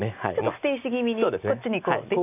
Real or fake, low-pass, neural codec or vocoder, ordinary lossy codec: real; 3.6 kHz; none; none